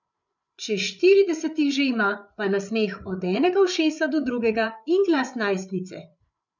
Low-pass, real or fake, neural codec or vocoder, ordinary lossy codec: 7.2 kHz; fake; codec, 16 kHz, 8 kbps, FreqCodec, larger model; none